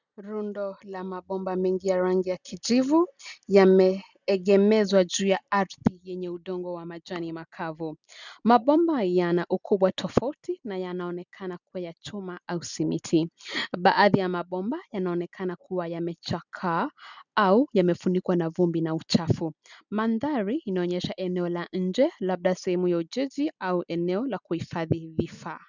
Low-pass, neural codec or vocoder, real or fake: 7.2 kHz; none; real